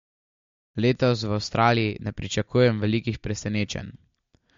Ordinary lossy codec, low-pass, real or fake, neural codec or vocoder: MP3, 48 kbps; 7.2 kHz; real; none